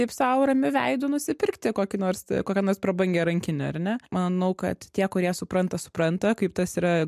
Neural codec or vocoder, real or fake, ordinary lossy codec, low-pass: none; real; MP3, 96 kbps; 14.4 kHz